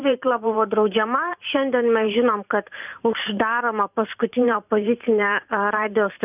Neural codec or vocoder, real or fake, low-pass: vocoder, 44.1 kHz, 128 mel bands every 512 samples, BigVGAN v2; fake; 3.6 kHz